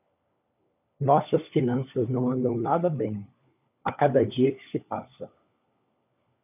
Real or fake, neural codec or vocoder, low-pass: fake; codec, 16 kHz, 4 kbps, FunCodec, trained on LibriTTS, 50 frames a second; 3.6 kHz